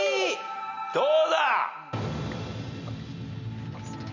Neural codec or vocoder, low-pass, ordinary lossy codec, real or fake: none; 7.2 kHz; none; real